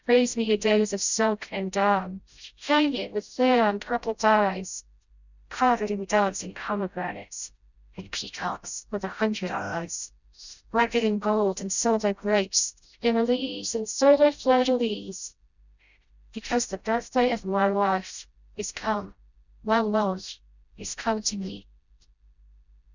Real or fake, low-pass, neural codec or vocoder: fake; 7.2 kHz; codec, 16 kHz, 0.5 kbps, FreqCodec, smaller model